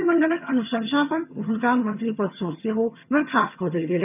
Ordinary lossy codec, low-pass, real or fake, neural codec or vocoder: none; 3.6 kHz; fake; vocoder, 22.05 kHz, 80 mel bands, HiFi-GAN